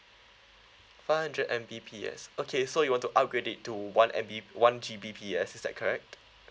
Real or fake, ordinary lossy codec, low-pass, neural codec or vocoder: real; none; none; none